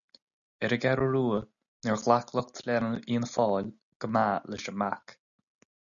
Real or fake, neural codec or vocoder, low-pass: real; none; 7.2 kHz